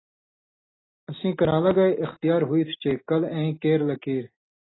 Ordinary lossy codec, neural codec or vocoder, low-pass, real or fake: AAC, 16 kbps; none; 7.2 kHz; real